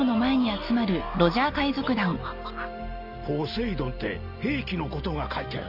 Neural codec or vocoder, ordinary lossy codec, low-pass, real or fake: none; none; 5.4 kHz; real